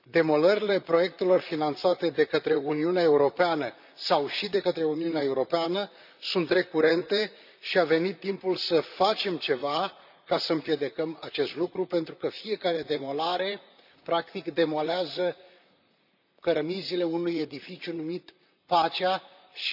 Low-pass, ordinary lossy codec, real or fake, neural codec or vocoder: 5.4 kHz; none; fake; vocoder, 44.1 kHz, 128 mel bands, Pupu-Vocoder